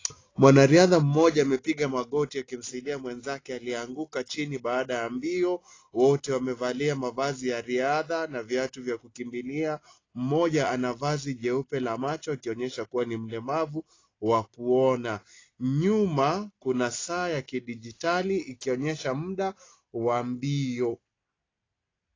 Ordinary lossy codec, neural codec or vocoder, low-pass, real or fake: AAC, 32 kbps; none; 7.2 kHz; real